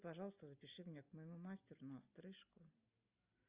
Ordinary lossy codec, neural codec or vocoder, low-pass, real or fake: Opus, 64 kbps; none; 3.6 kHz; real